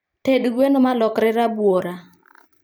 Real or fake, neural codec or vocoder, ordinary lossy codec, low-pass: fake; vocoder, 44.1 kHz, 128 mel bands every 512 samples, BigVGAN v2; none; none